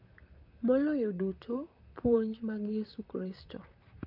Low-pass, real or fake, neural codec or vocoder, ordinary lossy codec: 5.4 kHz; fake; vocoder, 44.1 kHz, 128 mel bands, Pupu-Vocoder; none